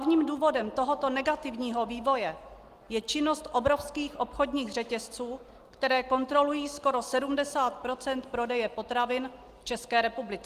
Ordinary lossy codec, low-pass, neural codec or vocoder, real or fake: Opus, 24 kbps; 14.4 kHz; none; real